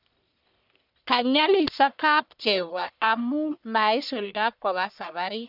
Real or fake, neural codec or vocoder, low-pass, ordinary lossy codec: fake; codec, 44.1 kHz, 1.7 kbps, Pupu-Codec; 5.4 kHz; none